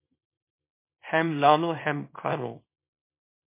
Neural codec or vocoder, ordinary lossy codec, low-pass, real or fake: codec, 24 kHz, 0.9 kbps, WavTokenizer, small release; MP3, 24 kbps; 3.6 kHz; fake